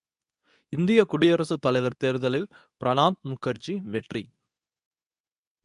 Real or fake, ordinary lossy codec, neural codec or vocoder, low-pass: fake; none; codec, 24 kHz, 0.9 kbps, WavTokenizer, medium speech release version 2; 10.8 kHz